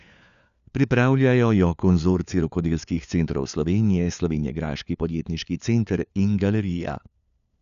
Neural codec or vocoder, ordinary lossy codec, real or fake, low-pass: codec, 16 kHz, 4 kbps, FunCodec, trained on LibriTTS, 50 frames a second; none; fake; 7.2 kHz